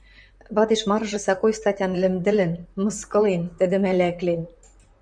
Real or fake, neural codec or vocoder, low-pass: fake; vocoder, 44.1 kHz, 128 mel bands, Pupu-Vocoder; 9.9 kHz